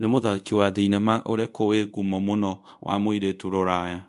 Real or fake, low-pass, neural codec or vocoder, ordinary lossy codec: fake; 10.8 kHz; codec, 24 kHz, 0.5 kbps, DualCodec; MP3, 64 kbps